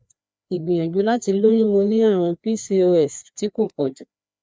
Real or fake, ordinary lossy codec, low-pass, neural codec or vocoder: fake; none; none; codec, 16 kHz, 2 kbps, FreqCodec, larger model